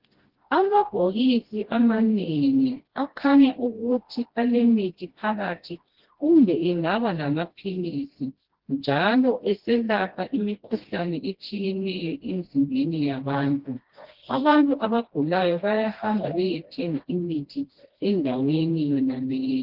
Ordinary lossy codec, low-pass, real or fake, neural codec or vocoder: Opus, 16 kbps; 5.4 kHz; fake; codec, 16 kHz, 1 kbps, FreqCodec, smaller model